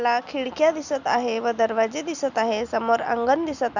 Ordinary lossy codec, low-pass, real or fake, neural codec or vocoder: none; 7.2 kHz; real; none